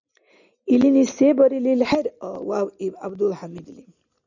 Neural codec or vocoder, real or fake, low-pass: none; real; 7.2 kHz